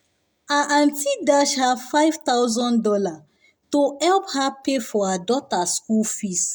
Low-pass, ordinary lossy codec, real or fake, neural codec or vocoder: none; none; real; none